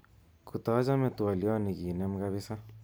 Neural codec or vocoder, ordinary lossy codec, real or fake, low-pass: none; none; real; none